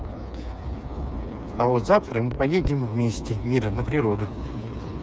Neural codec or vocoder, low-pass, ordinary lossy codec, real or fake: codec, 16 kHz, 4 kbps, FreqCodec, smaller model; none; none; fake